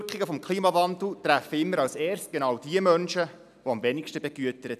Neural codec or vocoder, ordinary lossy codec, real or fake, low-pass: none; none; real; 14.4 kHz